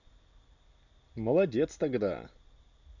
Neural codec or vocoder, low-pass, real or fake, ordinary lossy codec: none; 7.2 kHz; real; none